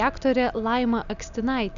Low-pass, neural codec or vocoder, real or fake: 7.2 kHz; none; real